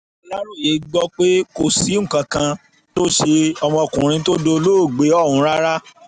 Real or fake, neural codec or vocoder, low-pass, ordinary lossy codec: real; none; 9.9 kHz; none